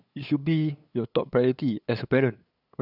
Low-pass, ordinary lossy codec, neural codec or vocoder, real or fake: 5.4 kHz; none; codec, 16 kHz, 8 kbps, FunCodec, trained on LibriTTS, 25 frames a second; fake